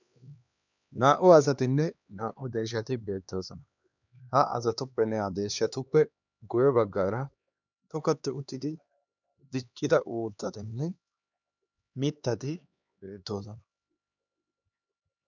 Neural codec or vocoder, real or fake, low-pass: codec, 16 kHz, 2 kbps, X-Codec, HuBERT features, trained on LibriSpeech; fake; 7.2 kHz